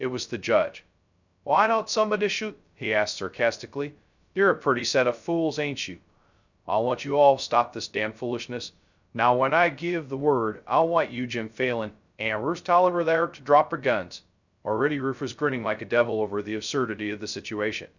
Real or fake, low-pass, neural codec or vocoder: fake; 7.2 kHz; codec, 16 kHz, 0.2 kbps, FocalCodec